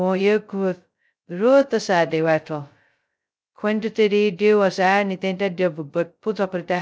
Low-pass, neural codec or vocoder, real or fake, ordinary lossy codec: none; codec, 16 kHz, 0.2 kbps, FocalCodec; fake; none